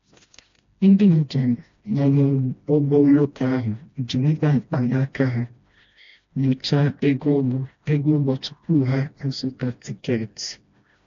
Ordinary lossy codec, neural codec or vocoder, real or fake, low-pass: AAC, 48 kbps; codec, 16 kHz, 1 kbps, FreqCodec, smaller model; fake; 7.2 kHz